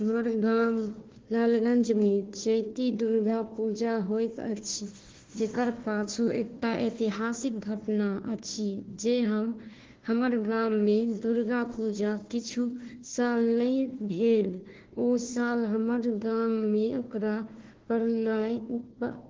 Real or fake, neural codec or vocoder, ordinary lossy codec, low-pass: fake; codec, 16 kHz, 1 kbps, FunCodec, trained on Chinese and English, 50 frames a second; Opus, 16 kbps; 7.2 kHz